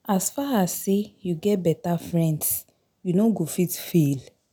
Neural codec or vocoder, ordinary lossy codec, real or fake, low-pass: none; none; real; none